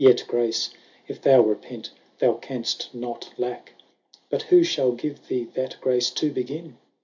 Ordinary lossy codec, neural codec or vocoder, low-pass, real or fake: MP3, 64 kbps; none; 7.2 kHz; real